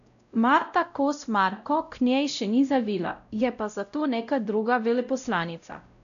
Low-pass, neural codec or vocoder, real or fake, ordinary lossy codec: 7.2 kHz; codec, 16 kHz, 0.5 kbps, X-Codec, WavLM features, trained on Multilingual LibriSpeech; fake; none